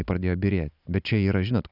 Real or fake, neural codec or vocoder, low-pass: real; none; 5.4 kHz